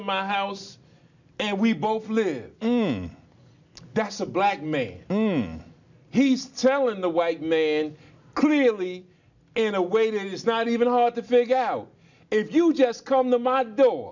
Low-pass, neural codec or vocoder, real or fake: 7.2 kHz; none; real